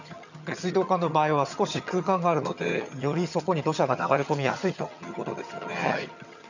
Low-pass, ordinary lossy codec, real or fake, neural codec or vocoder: 7.2 kHz; none; fake; vocoder, 22.05 kHz, 80 mel bands, HiFi-GAN